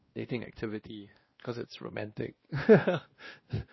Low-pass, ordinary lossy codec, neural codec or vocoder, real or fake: 7.2 kHz; MP3, 24 kbps; codec, 16 kHz, 1 kbps, X-Codec, WavLM features, trained on Multilingual LibriSpeech; fake